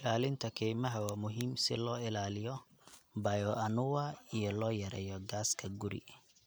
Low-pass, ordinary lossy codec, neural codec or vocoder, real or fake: none; none; none; real